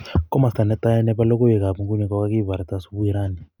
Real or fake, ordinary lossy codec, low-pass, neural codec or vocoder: real; none; 19.8 kHz; none